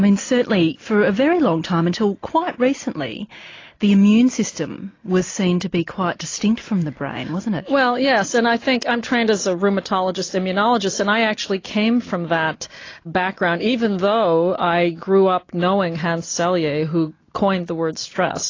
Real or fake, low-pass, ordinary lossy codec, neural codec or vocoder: real; 7.2 kHz; AAC, 32 kbps; none